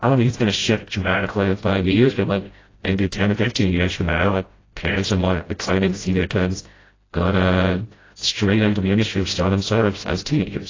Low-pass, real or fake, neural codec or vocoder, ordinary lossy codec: 7.2 kHz; fake; codec, 16 kHz, 0.5 kbps, FreqCodec, smaller model; AAC, 32 kbps